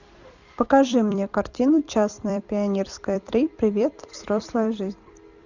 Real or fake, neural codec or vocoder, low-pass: fake; vocoder, 44.1 kHz, 128 mel bands every 256 samples, BigVGAN v2; 7.2 kHz